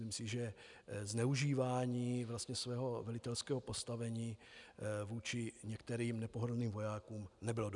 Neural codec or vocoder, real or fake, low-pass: none; real; 10.8 kHz